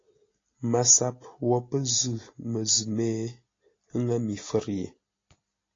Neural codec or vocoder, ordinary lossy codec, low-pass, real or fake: none; AAC, 32 kbps; 7.2 kHz; real